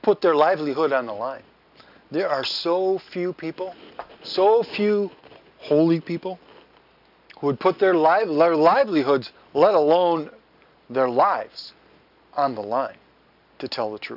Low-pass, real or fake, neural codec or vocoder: 5.4 kHz; real; none